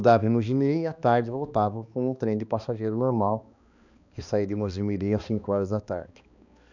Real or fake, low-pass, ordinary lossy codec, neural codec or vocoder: fake; 7.2 kHz; none; codec, 16 kHz, 2 kbps, X-Codec, HuBERT features, trained on balanced general audio